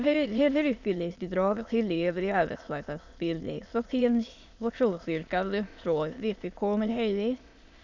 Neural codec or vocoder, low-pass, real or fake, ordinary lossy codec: autoencoder, 22.05 kHz, a latent of 192 numbers a frame, VITS, trained on many speakers; 7.2 kHz; fake; Opus, 64 kbps